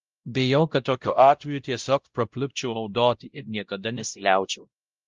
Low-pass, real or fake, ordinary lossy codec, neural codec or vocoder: 7.2 kHz; fake; Opus, 32 kbps; codec, 16 kHz, 0.5 kbps, X-Codec, WavLM features, trained on Multilingual LibriSpeech